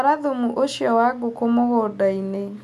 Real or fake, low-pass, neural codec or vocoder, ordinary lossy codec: real; 14.4 kHz; none; none